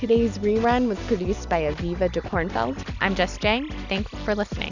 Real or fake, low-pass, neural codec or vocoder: real; 7.2 kHz; none